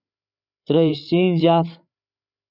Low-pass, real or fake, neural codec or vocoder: 5.4 kHz; fake; codec, 16 kHz, 8 kbps, FreqCodec, larger model